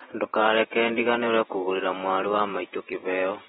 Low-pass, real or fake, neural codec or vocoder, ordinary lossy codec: 19.8 kHz; fake; vocoder, 48 kHz, 128 mel bands, Vocos; AAC, 16 kbps